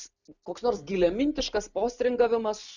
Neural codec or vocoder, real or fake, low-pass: none; real; 7.2 kHz